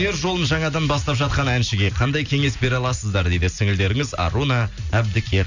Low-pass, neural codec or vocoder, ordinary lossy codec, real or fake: 7.2 kHz; none; none; real